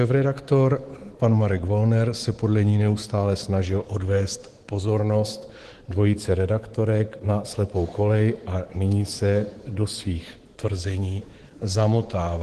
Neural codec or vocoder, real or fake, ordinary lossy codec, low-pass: codec, 24 kHz, 3.1 kbps, DualCodec; fake; Opus, 16 kbps; 10.8 kHz